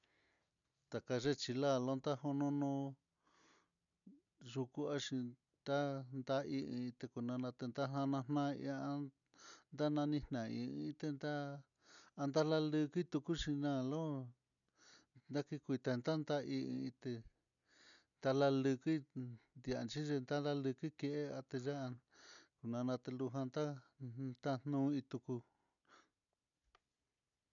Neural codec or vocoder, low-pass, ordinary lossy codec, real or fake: none; 7.2 kHz; none; real